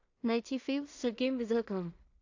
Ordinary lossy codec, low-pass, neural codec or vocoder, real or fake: AAC, 48 kbps; 7.2 kHz; codec, 16 kHz in and 24 kHz out, 0.4 kbps, LongCat-Audio-Codec, two codebook decoder; fake